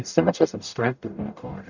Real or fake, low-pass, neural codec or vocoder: fake; 7.2 kHz; codec, 44.1 kHz, 0.9 kbps, DAC